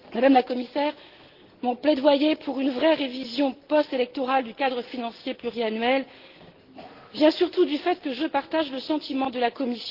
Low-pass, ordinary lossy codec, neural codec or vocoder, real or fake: 5.4 kHz; Opus, 16 kbps; none; real